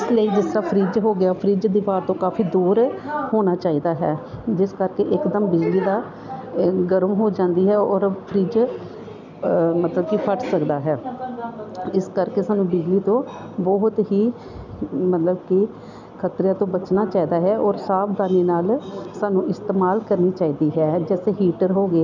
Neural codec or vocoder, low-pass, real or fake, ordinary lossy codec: none; 7.2 kHz; real; none